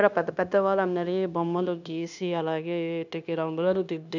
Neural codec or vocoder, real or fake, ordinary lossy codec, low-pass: codec, 16 kHz, 0.9 kbps, LongCat-Audio-Codec; fake; none; 7.2 kHz